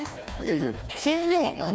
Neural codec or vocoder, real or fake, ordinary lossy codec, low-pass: codec, 16 kHz, 1 kbps, FunCodec, trained on Chinese and English, 50 frames a second; fake; none; none